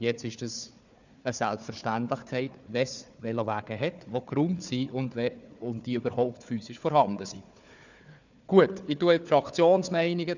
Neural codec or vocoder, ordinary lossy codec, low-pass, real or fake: codec, 16 kHz, 4 kbps, FunCodec, trained on Chinese and English, 50 frames a second; none; 7.2 kHz; fake